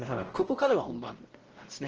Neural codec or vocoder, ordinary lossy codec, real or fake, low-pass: codec, 16 kHz, 0.5 kbps, X-Codec, WavLM features, trained on Multilingual LibriSpeech; Opus, 16 kbps; fake; 7.2 kHz